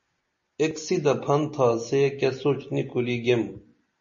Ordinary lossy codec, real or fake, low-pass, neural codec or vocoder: MP3, 32 kbps; real; 7.2 kHz; none